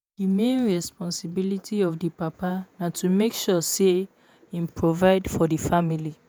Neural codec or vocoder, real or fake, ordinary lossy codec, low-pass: vocoder, 48 kHz, 128 mel bands, Vocos; fake; none; none